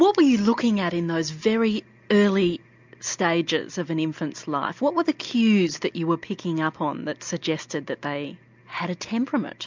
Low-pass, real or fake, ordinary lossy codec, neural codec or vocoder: 7.2 kHz; real; MP3, 64 kbps; none